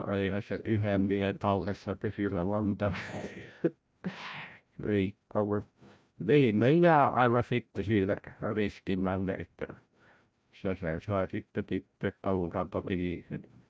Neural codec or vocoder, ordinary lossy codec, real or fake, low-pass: codec, 16 kHz, 0.5 kbps, FreqCodec, larger model; none; fake; none